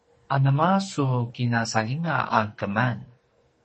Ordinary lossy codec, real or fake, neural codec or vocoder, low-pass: MP3, 32 kbps; fake; codec, 44.1 kHz, 2.6 kbps, SNAC; 10.8 kHz